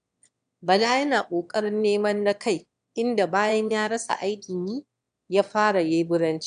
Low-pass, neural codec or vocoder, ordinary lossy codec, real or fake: 9.9 kHz; autoencoder, 22.05 kHz, a latent of 192 numbers a frame, VITS, trained on one speaker; none; fake